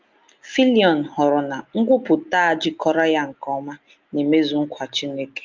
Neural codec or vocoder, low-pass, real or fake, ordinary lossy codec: none; 7.2 kHz; real; Opus, 32 kbps